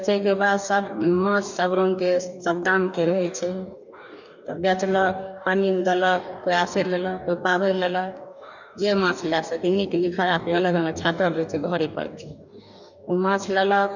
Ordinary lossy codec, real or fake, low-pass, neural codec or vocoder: none; fake; 7.2 kHz; codec, 44.1 kHz, 2.6 kbps, DAC